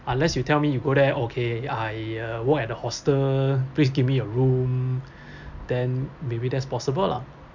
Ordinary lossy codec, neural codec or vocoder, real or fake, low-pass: none; none; real; 7.2 kHz